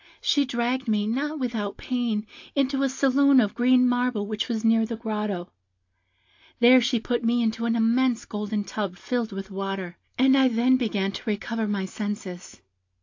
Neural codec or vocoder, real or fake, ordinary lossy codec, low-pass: none; real; AAC, 48 kbps; 7.2 kHz